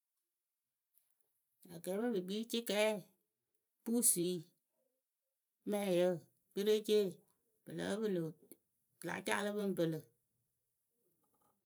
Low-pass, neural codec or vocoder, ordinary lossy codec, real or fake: none; vocoder, 44.1 kHz, 128 mel bands every 512 samples, BigVGAN v2; none; fake